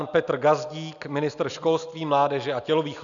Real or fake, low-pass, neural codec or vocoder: real; 7.2 kHz; none